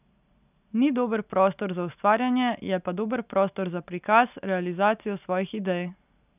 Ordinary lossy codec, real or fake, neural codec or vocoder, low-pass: none; real; none; 3.6 kHz